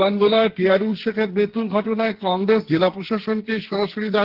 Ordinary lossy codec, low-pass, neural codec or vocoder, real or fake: Opus, 16 kbps; 5.4 kHz; codec, 44.1 kHz, 2.6 kbps, SNAC; fake